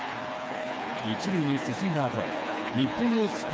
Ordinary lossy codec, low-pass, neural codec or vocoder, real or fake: none; none; codec, 16 kHz, 4 kbps, FreqCodec, smaller model; fake